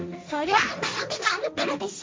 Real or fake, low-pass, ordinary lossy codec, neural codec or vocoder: fake; none; none; codec, 16 kHz, 1.1 kbps, Voila-Tokenizer